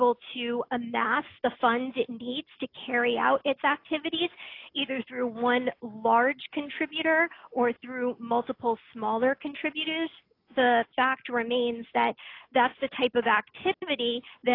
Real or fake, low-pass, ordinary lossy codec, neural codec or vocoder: real; 5.4 kHz; AAC, 32 kbps; none